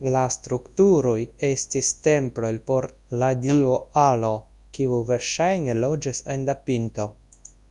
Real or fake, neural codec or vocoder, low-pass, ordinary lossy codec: fake; codec, 24 kHz, 0.9 kbps, WavTokenizer, large speech release; 10.8 kHz; Opus, 64 kbps